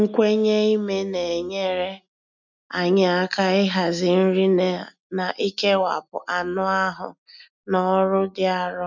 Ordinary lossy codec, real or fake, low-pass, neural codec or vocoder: none; real; 7.2 kHz; none